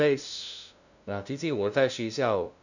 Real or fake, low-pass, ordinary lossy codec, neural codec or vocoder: fake; 7.2 kHz; none; codec, 16 kHz, 0.5 kbps, FunCodec, trained on LibriTTS, 25 frames a second